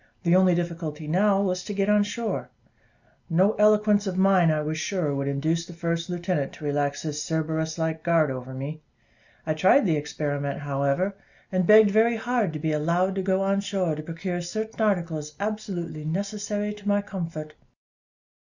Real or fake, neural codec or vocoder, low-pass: real; none; 7.2 kHz